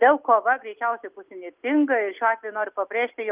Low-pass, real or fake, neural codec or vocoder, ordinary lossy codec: 3.6 kHz; real; none; Opus, 24 kbps